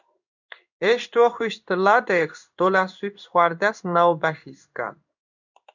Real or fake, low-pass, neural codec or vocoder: fake; 7.2 kHz; codec, 16 kHz in and 24 kHz out, 1 kbps, XY-Tokenizer